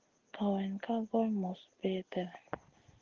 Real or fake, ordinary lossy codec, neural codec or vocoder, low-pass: real; Opus, 16 kbps; none; 7.2 kHz